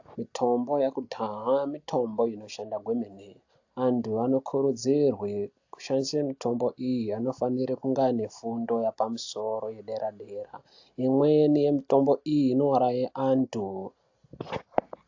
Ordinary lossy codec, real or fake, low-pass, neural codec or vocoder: AAC, 48 kbps; real; 7.2 kHz; none